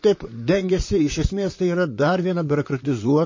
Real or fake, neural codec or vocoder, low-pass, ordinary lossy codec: fake; vocoder, 44.1 kHz, 128 mel bands, Pupu-Vocoder; 7.2 kHz; MP3, 32 kbps